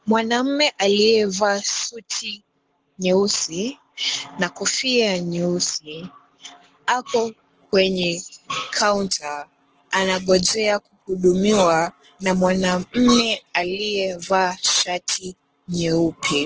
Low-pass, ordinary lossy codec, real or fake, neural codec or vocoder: 7.2 kHz; Opus, 16 kbps; fake; codec, 44.1 kHz, 7.8 kbps, Pupu-Codec